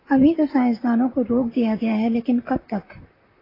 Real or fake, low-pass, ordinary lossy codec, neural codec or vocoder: fake; 5.4 kHz; AAC, 24 kbps; vocoder, 44.1 kHz, 128 mel bands, Pupu-Vocoder